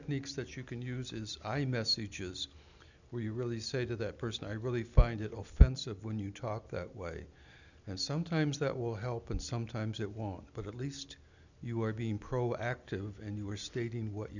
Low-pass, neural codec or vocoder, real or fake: 7.2 kHz; none; real